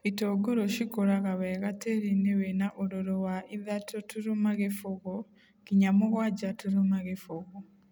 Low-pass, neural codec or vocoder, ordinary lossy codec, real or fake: none; none; none; real